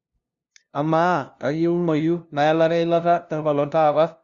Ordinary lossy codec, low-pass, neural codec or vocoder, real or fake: Opus, 64 kbps; 7.2 kHz; codec, 16 kHz, 0.5 kbps, FunCodec, trained on LibriTTS, 25 frames a second; fake